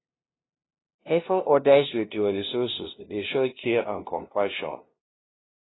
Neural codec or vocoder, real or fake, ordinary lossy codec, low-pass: codec, 16 kHz, 0.5 kbps, FunCodec, trained on LibriTTS, 25 frames a second; fake; AAC, 16 kbps; 7.2 kHz